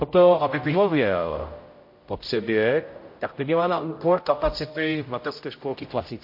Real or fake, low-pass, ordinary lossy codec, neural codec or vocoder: fake; 5.4 kHz; MP3, 32 kbps; codec, 16 kHz, 0.5 kbps, X-Codec, HuBERT features, trained on general audio